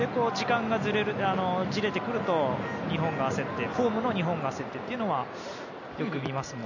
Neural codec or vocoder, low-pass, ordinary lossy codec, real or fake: none; 7.2 kHz; none; real